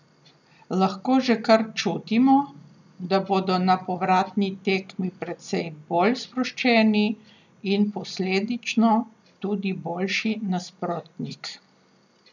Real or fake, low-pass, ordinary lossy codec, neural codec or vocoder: real; 7.2 kHz; none; none